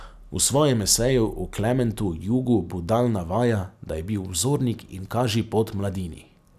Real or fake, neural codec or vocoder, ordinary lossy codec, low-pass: real; none; none; 14.4 kHz